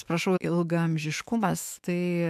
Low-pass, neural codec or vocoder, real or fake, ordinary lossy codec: 14.4 kHz; autoencoder, 48 kHz, 128 numbers a frame, DAC-VAE, trained on Japanese speech; fake; MP3, 96 kbps